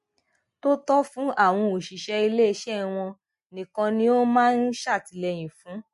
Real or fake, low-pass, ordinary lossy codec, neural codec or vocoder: real; 14.4 kHz; MP3, 48 kbps; none